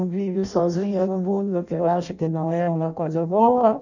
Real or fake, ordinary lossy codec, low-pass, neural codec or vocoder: fake; none; 7.2 kHz; codec, 16 kHz in and 24 kHz out, 0.6 kbps, FireRedTTS-2 codec